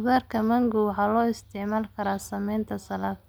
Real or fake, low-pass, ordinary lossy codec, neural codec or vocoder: real; none; none; none